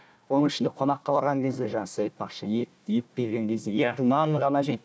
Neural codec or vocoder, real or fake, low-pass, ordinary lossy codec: codec, 16 kHz, 1 kbps, FunCodec, trained on Chinese and English, 50 frames a second; fake; none; none